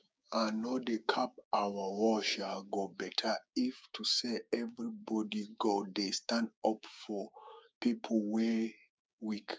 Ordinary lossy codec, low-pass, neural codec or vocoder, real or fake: none; none; codec, 16 kHz, 6 kbps, DAC; fake